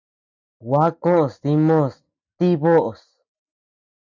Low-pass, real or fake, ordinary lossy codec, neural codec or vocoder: 7.2 kHz; real; MP3, 64 kbps; none